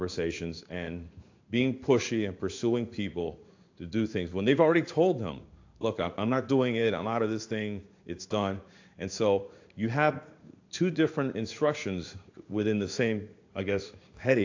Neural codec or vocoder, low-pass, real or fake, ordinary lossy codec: codec, 16 kHz in and 24 kHz out, 1 kbps, XY-Tokenizer; 7.2 kHz; fake; AAC, 48 kbps